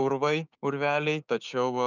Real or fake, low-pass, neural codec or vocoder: fake; 7.2 kHz; codec, 16 kHz, 6 kbps, DAC